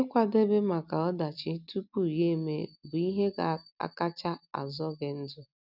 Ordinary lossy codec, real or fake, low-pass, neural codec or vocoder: none; real; 5.4 kHz; none